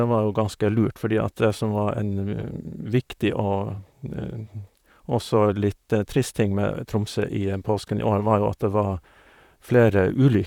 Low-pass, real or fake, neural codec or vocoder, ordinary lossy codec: 19.8 kHz; fake; vocoder, 44.1 kHz, 128 mel bands, Pupu-Vocoder; none